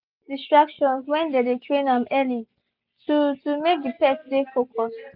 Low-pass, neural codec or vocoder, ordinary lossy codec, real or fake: 5.4 kHz; none; none; real